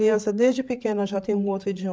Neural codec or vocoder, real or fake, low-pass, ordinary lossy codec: codec, 16 kHz, 8 kbps, FreqCodec, larger model; fake; none; none